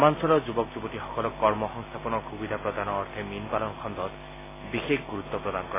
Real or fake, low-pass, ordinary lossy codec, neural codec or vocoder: real; 3.6 kHz; AAC, 16 kbps; none